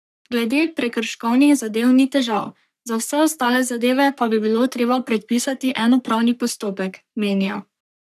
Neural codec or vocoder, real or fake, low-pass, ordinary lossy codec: codec, 44.1 kHz, 3.4 kbps, Pupu-Codec; fake; 14.4 kHz; none